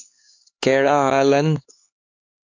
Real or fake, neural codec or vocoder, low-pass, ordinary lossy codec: fake; codec, 16 kHz, 4 kbps, X-Codec, HuBERT features, trained on LibriSpeech; 7.2 kHz; AAC, 48 kbps